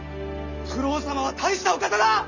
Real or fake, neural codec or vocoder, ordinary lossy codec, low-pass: real; none; none; 7.2 kHz